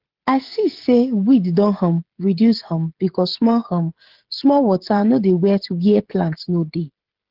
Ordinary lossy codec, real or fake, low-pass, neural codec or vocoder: Opus, 16 kbps; fake; 5.4 kHz; codec, 16 kHz, 16 kbps, FreqCodec, smaller model